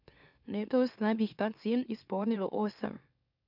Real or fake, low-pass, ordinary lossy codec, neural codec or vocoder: fake; 5.4 kHz; none; autoencoder, 44.1 kHz, a latent of 192 numbers a frame, MeloTTS